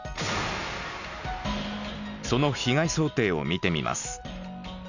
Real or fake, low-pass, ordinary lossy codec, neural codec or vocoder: real; 7.2 kHz; none; none